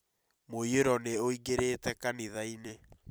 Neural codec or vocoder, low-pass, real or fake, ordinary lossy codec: vocoder, 44.1 kHz, 128 mel bands every 256 samples, BigVGAN v2; none; fake; none